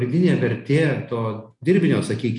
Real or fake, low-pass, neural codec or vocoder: real; 10.8 kHz; none